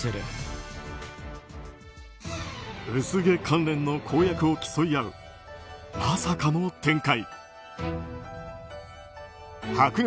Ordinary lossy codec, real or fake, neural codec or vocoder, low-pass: none; real; none; none